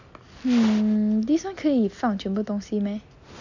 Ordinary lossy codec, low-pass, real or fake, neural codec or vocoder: none; 7.2 kHz; real; none